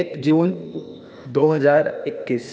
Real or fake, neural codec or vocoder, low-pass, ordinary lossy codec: fake; codec, 16 kHz, 0.8 kbps, ZipCodec; none; none